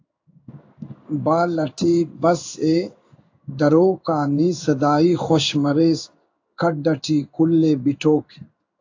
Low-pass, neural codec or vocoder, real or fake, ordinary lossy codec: 7.2 kHz; codec, 16 kHz in and 24 kHz out, 1 kbps, XY-Tokenizer; fake; AAC, 48 kbps